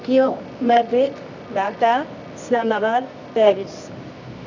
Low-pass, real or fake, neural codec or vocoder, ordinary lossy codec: 7.2 kHz; fake; codec, 24 kHz, 0.9 kbps, WavTokenizer, medium music audio release; none